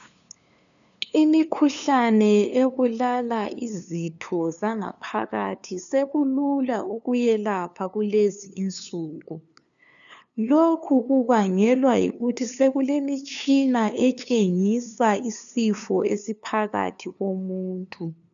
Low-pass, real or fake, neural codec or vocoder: 7.2 kHz; fake; codec, 16 kHz, 2 kbps, FunCodec, trained on LibriTTS, 25 frames a second